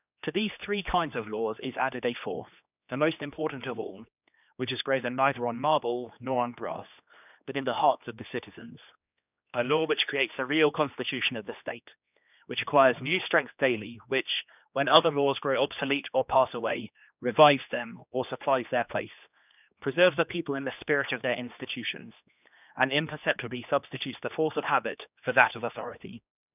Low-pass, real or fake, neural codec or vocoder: 3.6 kHz; fake; codec, 16 kHz, 2 kbps, X-Codec, HuBERT features, trained on general audio